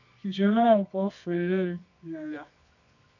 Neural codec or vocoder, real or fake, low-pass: codec, 24 kHz, 0.9 kbps, WavTokenizer, medium music audio release; fake; 7.2 kHz